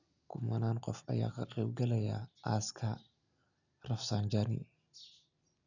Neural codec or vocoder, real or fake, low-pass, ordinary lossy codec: none; real; 7.2 kHz; none